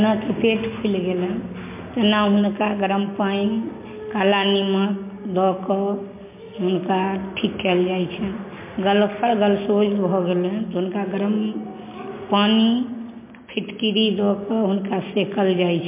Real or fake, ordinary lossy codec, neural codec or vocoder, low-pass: real; MP3, 24 kbps; none; 3.6 kHz